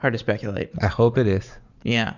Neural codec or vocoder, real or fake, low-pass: vocoder, 44.1 kHz, 80 mel bands, Vocos; fake; 7.2 kHz